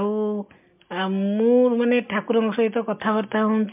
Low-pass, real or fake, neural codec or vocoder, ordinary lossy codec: 3.6 kHz; real; none; MP3, 32 kbps